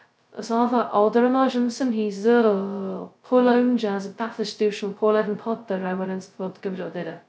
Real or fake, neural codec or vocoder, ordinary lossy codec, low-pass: fake; codec, 16 kHz, 0.2 kbps, FocalCodec; none; none